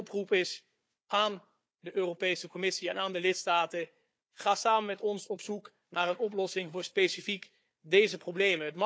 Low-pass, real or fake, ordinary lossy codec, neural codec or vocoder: none; fake; none; codec, 16 kHz, 4 kbps, FunCodec, trained on Chinese and English, 50 frames a second